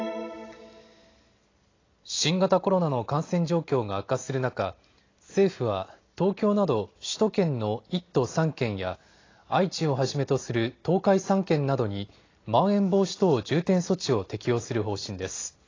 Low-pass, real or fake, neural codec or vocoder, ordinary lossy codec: 7.2 kHz; real; none; AAC, 32 kbps